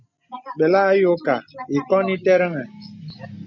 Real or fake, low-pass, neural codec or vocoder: real; 7.2 kHz; none